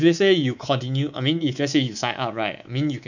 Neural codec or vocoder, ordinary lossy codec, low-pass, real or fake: codec, 24 kHz, 3.1 kbps, DualCodec; none; 7.2 kHz; fake